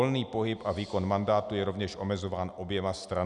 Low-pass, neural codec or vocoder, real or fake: 10.8 kHz; none; real